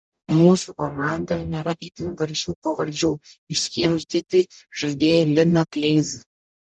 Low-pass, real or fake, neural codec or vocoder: 10.8 kHz; fake; codec, 44.1 kHz, 0.9 kbps, DAC